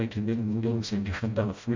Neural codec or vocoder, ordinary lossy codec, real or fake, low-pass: codec, 16 kHz, 0.5 kbps, FreqCodec, smaller model; MP3, 48 kbps; fake; 7.2 kHz